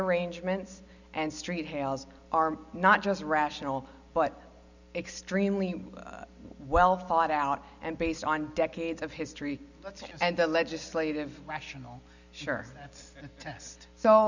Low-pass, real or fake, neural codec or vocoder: 7.2 kHz; real; none